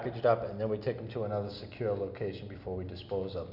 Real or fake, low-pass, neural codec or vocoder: real; 5.4 kHz; none